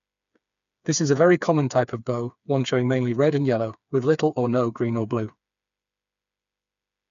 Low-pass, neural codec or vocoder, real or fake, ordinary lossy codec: 7.2 kHz; codec, 16 kHz, 4 kbps, FreqCodec, smaller model; fake; none